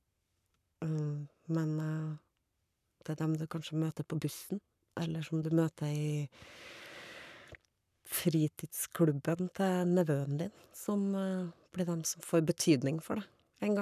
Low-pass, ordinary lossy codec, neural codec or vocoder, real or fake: 14.4 kHz; none; codec, 44.1 kHz, 7.8 kbps, Pupu-Codec; fake